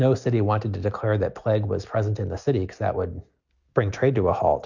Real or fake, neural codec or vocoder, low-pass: real; none; 7.2 kHz